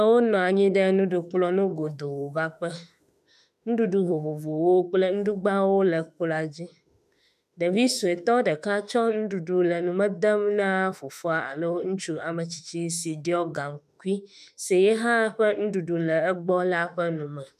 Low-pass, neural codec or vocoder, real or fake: 14.4 kHz; autoencoder, 48 kHz, 32 numbers a frame, DAC-VAE, trained on Japanese speech; fake